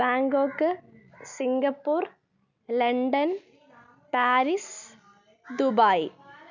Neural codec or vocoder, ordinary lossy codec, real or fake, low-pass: none; none; real; 7.2 kHz